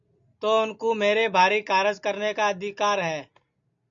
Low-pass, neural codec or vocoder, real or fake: 7.2 kHz; none; real